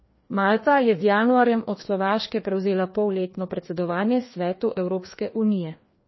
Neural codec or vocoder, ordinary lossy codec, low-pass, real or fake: codec, 16 kHz, 2 kbps, FreqCodec, larger model; MP3, 24 kbps; 7.2 kHz; fake